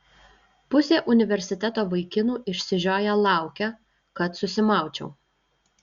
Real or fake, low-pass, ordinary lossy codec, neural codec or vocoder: real; 7.2 kHz; Opus, 64 kbps; none